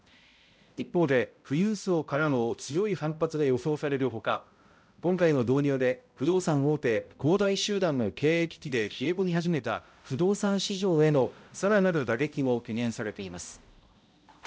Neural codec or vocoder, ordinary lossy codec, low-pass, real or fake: codec, 16 kHz, 0.5 kbps, X-Codec, HuBERT features, trained on balanced general audio; none; none; fake